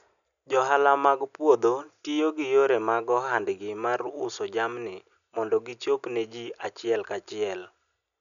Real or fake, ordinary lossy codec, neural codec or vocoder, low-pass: real; none; none; 7.2 kHz